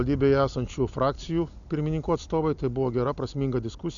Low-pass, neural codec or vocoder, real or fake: 7.2 kHz; none; real